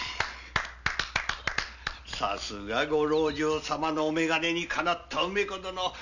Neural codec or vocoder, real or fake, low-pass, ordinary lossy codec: none; real; 7.2 kHz; none